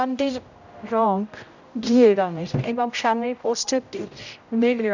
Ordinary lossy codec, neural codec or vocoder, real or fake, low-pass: none; codec, 16 kHz, 0.5 kbps, X-Codec, HuBERT features, trained on general audio; fake; 7.2 kHz